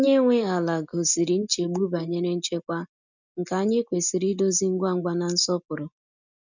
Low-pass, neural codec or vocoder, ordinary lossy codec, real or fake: 7.2 kHz; none; none; real